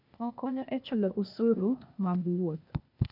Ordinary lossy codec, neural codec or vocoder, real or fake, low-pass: none; codec, 16 kHz, 0.8 kbps, ZipCodec; fake; 5.4 kHz